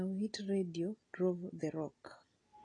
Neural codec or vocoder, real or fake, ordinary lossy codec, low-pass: none; real; AAC, 32 kbps; 9.9 kHz